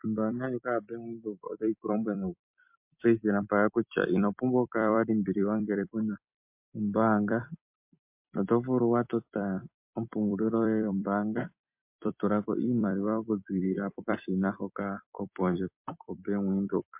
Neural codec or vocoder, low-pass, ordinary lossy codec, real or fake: vocoder, 44.1 kHz, 128 mel bands every 256 samples, BigVGAN v2; 3.6 kHz; MP3, 32 kbps; fake